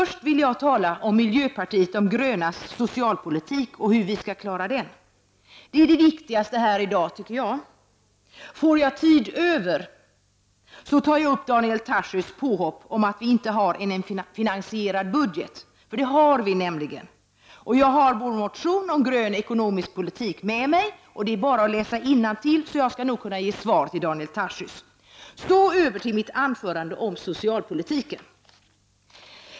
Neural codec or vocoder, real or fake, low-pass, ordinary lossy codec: none; real; none; none